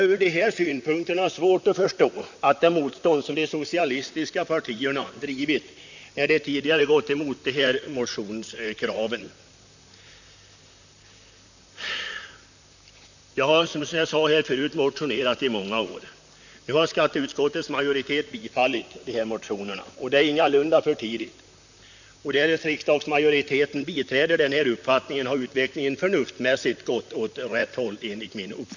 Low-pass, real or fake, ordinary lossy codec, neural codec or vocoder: 7.2 kHz; fake; none; vocoder, 44.1 kHz, 128 mel bands, Pupu-Vocoder